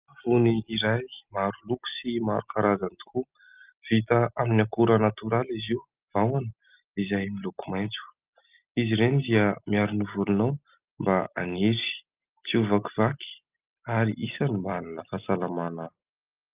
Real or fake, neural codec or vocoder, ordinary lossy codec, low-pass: real; none; Opus, 24 kbps; 3.6 kHz